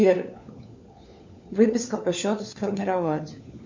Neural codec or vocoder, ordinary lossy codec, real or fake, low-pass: codec, 16 kHz, 4 kbps, FunCodec, trained on LibriTTS, 50 frames a second; MP3, 64 kbps; fake; 7.2 kHz